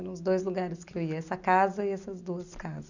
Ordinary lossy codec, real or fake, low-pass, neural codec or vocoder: none; real; 7.2 kHz; none